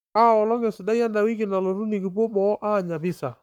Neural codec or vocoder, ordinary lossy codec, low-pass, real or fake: codec, 44.1 kHz, 7.8 kbps, Pupu-Codec; none; 19.8 kHz; fake